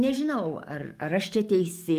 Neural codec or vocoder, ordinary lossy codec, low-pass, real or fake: codec, 44.1 kHz, 7.8 kbps, DAC; Opus, 32 kbps; 14.4 kHz; fake